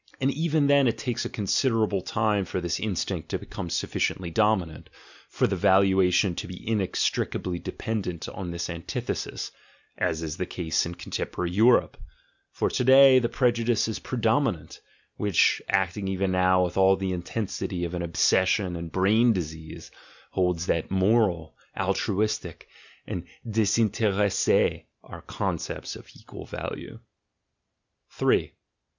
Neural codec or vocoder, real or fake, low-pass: none; real; 7.2 kHz